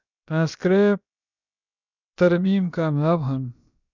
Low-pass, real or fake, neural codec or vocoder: 7.2 kHz; fake; codec, 16 kHz, about 1 kbps, DyCAST, with the encoder's durations